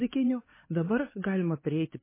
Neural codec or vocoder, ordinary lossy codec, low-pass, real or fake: codec, 16 kHz, 4 kbps, X-Codec, HuBERT features, trained on LibriSpeech; MP3, 16 kbps; 3.6 kHz; fake